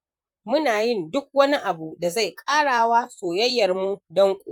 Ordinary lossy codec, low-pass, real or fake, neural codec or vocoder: none; 19.8 kHz; fake; vocoder, 44.1 kHz, 128 mel bands, Pupu-Vocoder